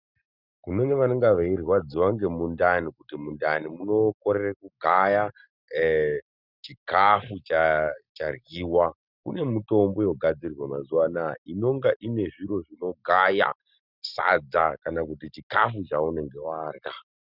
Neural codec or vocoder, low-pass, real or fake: none; 5.4 kHz; real